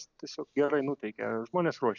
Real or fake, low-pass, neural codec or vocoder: real; 7.2 kHz; none